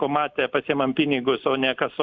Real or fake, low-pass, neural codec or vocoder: real; 7.2 kHz; none